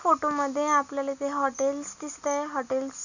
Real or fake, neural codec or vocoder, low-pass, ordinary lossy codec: real; none; 7.2 kHz; none